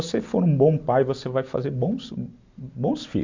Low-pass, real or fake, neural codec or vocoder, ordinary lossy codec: 7.2 kHz; real; none; MP3, 64 kbps